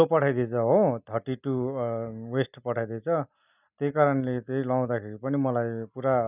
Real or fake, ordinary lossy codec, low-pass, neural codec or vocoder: real; none; 3.6 kHz; none